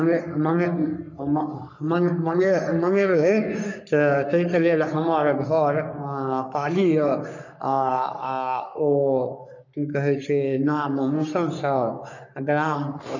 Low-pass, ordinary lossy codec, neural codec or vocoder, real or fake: 7.2 kHz; none; codec, 44.1 kHz, 3.4 kbps, Pupu-Codec; fake